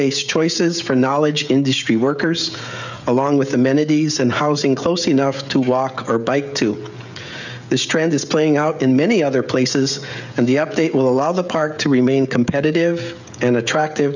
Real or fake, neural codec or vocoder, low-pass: fake; codec, 16 kHz, 16 kbps, FreqCodec, smaller model; 7.2 kHz